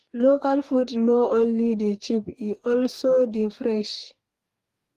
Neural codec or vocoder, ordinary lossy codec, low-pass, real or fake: codec, 44.1 kHz, 2.6 kbps, DAC; Opus, 24 kbps; 14.4 kHz; fake